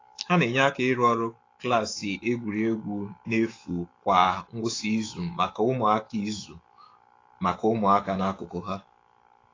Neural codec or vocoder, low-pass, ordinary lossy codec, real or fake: autoencoder, 48 kHz, 128 numbers a frame, DAC-VAE, trained on Japanese speech; 7.2 kHz; AAC, 32 kbps; fake